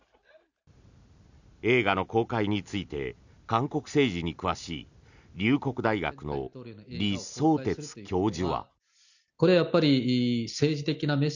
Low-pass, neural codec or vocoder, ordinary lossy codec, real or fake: 7.2 kHz; none; none; real